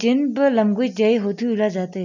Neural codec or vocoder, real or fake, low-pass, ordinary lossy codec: none; real; 7.2 kHz; none